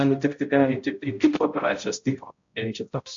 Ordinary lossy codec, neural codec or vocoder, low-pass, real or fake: MP3, 48 kbps; codec, 16 kHz, 0.5 kbps, X-Codec, HuBERT features, trained on balanced general audio; 7.2 kHz; fake